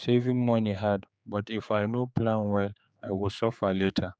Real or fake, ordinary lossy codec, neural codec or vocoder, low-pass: fake; none; codec, 16 kHz, 4 kbps, X-Codec, HuBERT features, trained on general audio; none